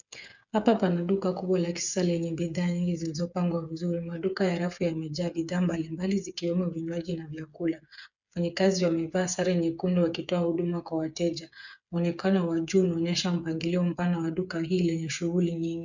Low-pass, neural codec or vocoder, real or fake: 7.2 kHz; codec, 16 kHz, 8 kbps, FreqCodec, smaller model; fake